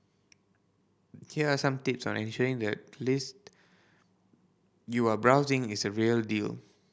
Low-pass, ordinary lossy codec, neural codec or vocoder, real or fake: none; none; none; real